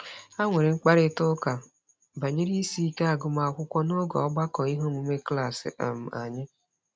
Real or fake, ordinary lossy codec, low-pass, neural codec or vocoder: real; none; none; none